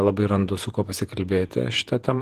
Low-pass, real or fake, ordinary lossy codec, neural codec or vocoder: 14.4 kHz; fake; Opus, 16 kbps; vocoder, 48 kHz, 128 mel bands, Vocos